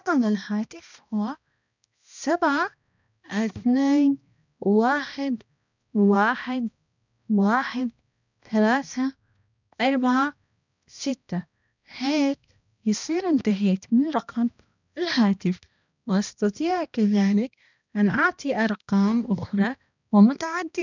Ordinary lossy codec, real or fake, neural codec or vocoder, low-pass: none; fake; codec, 16 kHz, 1 kbps, X-Codec, HuBERT features, trained on balanced general audio; 7.2 kHz